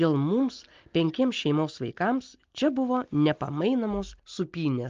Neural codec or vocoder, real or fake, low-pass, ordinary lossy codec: none; real; 7.2 kHz; Opus, 32 kbps